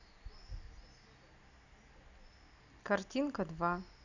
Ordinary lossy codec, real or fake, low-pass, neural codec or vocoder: none; real; 7.2 kHz; none